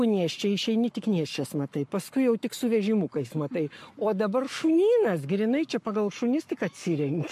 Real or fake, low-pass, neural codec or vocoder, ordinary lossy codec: fake; 14.4 kHz; codec, 44.1 kHz, 7.8 kbps, Pupu-Codec; MP3, 64 kbps